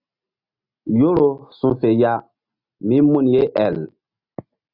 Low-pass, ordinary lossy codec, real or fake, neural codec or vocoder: 5.4 kHz; Opus, 64 kbps; real; none